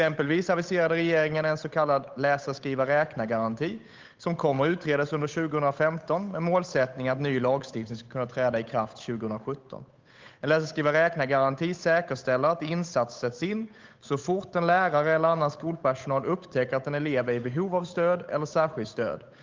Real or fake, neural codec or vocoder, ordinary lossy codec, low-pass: fake; codec, 16 kHz, 8 kbps, FunCodec, trained on Chinese and English, 25 frames a second; Opus, 24 kbps; 7.2 kHz